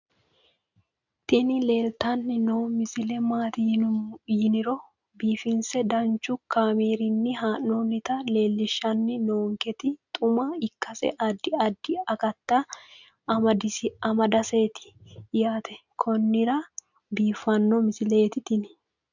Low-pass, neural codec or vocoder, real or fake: 7.2 kHz; none; real